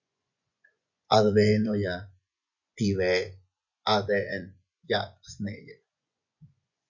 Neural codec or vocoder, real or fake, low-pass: vocoder, 24 kHz, 100 mel bands, Vocos; fake; 7.2 kHz